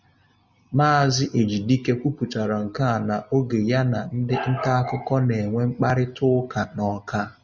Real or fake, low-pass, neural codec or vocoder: real; 7.2 kHz; none